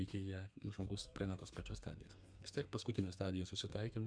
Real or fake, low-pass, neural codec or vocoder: fake; 9.9 kHz; codec, 32 kHz, 1.9 kbps, SNAC